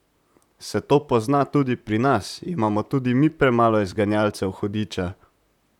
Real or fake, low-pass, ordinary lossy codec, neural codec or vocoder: fake; 19.8 kHz; none; vocoder, 44.1 kHz, 128 mel bands, Pupu-Vocoder